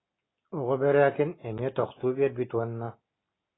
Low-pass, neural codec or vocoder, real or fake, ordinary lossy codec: 7.2 kHz; none; real; AAC, 16 kbps